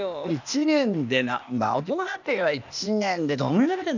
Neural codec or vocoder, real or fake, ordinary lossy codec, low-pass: codec, 16 kHz, 0.8 kbps, ZipCodec; fake; none; 7.2 kHz